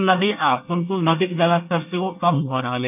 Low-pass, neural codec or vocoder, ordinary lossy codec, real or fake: 3.6 kHz; codec, 24 kHz, 1 kbps, SNAC; none; fake